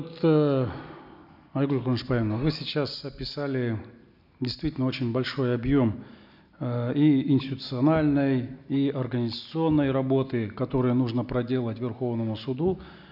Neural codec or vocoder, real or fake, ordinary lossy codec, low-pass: none; real; none; 5.4 kHz